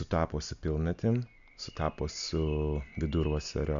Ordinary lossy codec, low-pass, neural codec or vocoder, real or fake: MP3, 96 kbps; 7.2 kHz; none; real